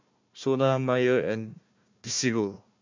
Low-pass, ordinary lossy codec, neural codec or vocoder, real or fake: 7.2 kHz; MP3, 48 kbps; codec, 16 kHz, 1 kbps, FunCodec, trained on Chinese and English, 50 frames a second; fake